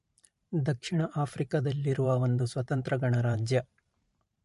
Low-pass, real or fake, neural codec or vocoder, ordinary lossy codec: 14.4 kHz; fake; vocoder, 44.1 kHz, 128 mel bands every 512 samples, BigVGAN v2; MP3, 48 kbps